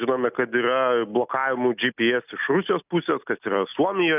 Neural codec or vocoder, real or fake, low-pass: none; real; 3.6 kHz